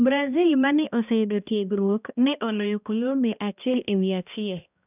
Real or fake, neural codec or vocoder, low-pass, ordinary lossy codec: fake; codec, 16 kHz, 1 kbps, X-Codec, HuBERT features, trained on balanced general audio; 3.6 kHz; none